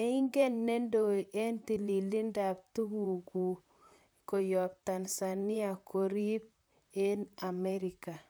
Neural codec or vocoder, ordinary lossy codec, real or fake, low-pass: vocoder, 44.1 kHz, 128 mel bands, Pupu-Vocoder; none; fake; none